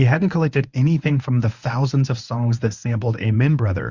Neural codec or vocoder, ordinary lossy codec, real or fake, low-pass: codec, 24 kHz, 0.9 kbps, WavTokenizer, medium speech release version 1; Opus, 64 kbps; fake; 7.2 kHz